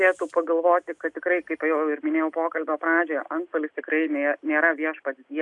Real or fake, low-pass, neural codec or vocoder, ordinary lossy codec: real; 10.8 kHz; none; MP3, 96 kbps